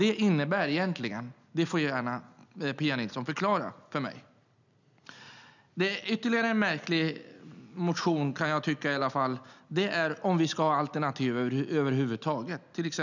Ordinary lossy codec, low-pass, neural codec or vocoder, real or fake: none; 7.2 kHz; none; real